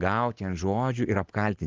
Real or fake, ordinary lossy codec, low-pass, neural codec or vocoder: real; Opus, 16 kbps; 7.2 kHz; none